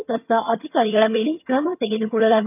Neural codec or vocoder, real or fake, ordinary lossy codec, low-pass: vocoder, 22.05 kHz, 80 mel bands, HiFi-GAN; fake; none; 3.6 kHz